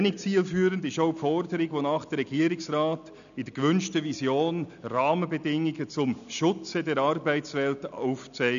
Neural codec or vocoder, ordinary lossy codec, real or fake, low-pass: none; none; real; 7.2 kHz